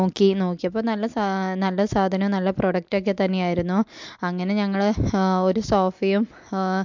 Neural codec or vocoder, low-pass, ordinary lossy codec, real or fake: none; 7.2 kHz; MP3, 64 kbps; real